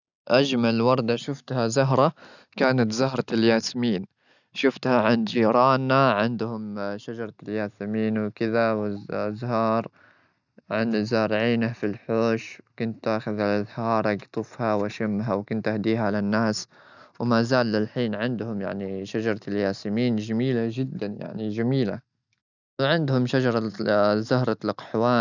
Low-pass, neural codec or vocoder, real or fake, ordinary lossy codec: 7.2 kHz; none; real; none